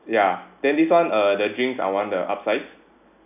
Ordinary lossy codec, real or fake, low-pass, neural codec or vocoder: none; real; 3.6 kHz; none